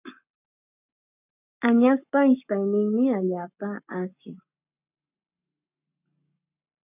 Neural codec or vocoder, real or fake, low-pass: codec, 44.1 kHz, 7.8 kbps, Pupu-Codec; fake; 3.6 kHz